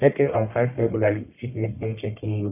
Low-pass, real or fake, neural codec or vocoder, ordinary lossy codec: 3.6 kHz; fake; codec, 44.1 kHz, 1.7 kbps, Pupu-Codec; none